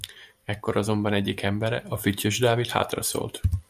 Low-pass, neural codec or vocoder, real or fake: 14.4 kHz; vocoder, 44.1 kHz, 128 mel bands every 512 samples, BigVGAN v2; fake